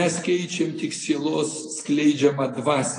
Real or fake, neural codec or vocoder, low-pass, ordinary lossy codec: real; none; 9.9 kHz; AAC, 32 kbps